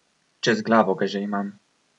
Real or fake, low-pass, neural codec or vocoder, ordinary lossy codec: real; 10.8 kHz; none; none